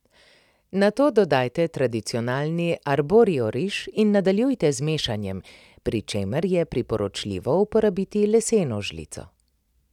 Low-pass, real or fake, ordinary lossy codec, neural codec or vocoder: 19.8 kHz; real; none; none